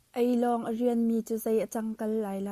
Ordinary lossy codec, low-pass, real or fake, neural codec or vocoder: Opus, 64 kbps; 14.4 kHz; real; none